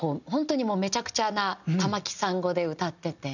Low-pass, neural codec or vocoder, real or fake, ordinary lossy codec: 7.2 kHz; none; real; none